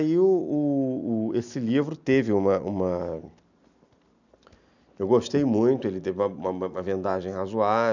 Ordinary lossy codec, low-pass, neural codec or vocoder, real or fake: none; 7.2 kHz; none; real